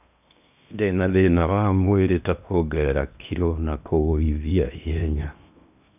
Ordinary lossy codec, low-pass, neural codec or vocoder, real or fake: none; 3.6 kHz; codec, 16 kHz in and 24 kHz out, 0.8 kbps, FocalCodec, streaming, 65536 codes; fake